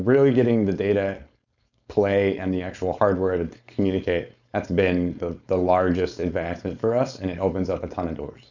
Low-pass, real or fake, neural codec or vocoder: 7.2 kHz; fake; codec, 16 kHz, 4.8 kbps, FACodec